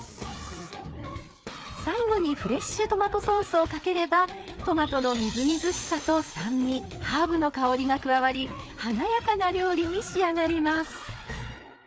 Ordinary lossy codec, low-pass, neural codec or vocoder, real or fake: none; none; codec, 16 kHz, 4 kbps, FreqCodec, larger model; fake